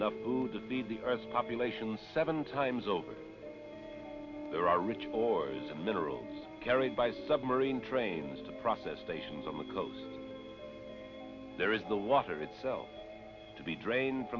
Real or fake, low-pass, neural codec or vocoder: real; 7.2 kHz; none